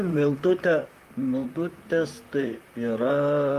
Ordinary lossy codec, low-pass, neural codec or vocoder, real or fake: Opus, 32 kbps; 14.4 kHz; vocoder, 44.1 kHz, 128 mel bands, Pupu-Vocoder; fake